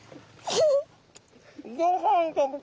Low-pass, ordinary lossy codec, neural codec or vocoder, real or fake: none; none; none; real